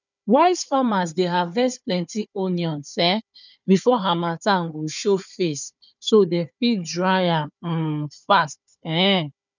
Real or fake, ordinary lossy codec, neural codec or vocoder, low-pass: fake; none; codec, 16 kHz, 4 kbps, FunCodec, trained on Chinese and English, 50 frames a second; 7.2 kHz